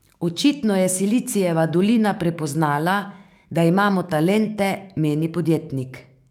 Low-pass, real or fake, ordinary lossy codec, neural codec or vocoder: 19.8 kHz; fake; none; codec, 44.1 kHz, 7.8 kbps, DAC